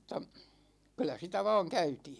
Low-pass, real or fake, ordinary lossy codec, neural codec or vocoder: none; real; none; none